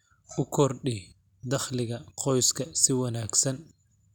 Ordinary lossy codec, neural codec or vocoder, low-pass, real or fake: none; none; 19.8 kHz; real